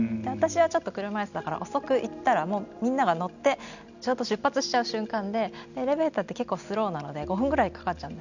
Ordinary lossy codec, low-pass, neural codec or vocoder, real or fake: none; 7.2 kHz; none; real